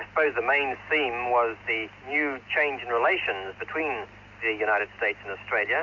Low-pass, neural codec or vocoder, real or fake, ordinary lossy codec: 7.2 kHz; none; real; AAC, 48 kbps